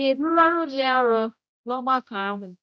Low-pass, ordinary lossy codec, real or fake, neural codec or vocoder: none; none; fake; codec, 16 kHz, 0.5 kbps, X-Codec, HuBERT features, trained on general audio